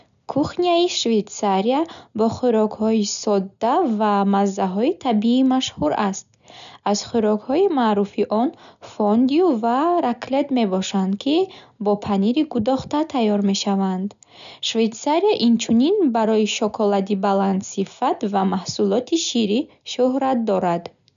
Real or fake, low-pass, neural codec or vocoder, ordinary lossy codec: real; 7.2 kHz; none; none